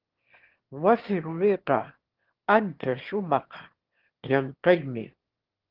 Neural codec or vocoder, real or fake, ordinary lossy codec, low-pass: autoencoder, 22.05 kHz, a latent of 192 numbers a frame, VITS, trained on one speaker; fake; Opus, 16 kbps; 5.4 kHz